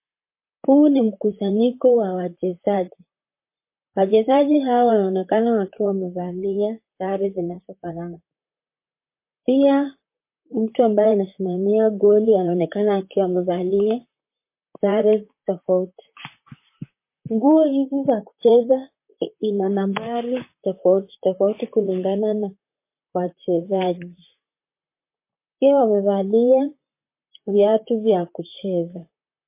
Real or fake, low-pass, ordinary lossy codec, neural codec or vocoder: fake; 3.6 kHz; MP3, 24 kbps; vocoder, 44.1 kHz, 128 mel bands, Pupu-Vocoder